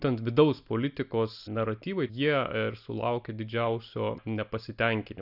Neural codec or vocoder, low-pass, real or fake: none; 5.4 kHz; real